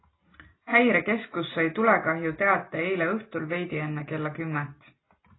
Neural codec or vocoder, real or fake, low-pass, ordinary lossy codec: none; real; 7.2 kHz; AAC, 16 kbps